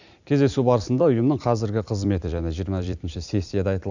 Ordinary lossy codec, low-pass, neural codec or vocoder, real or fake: none; 7.2 kHz; vocoder, 44.1 kHz, 128 mel bands every 256 samples, BigVGAN v2; fake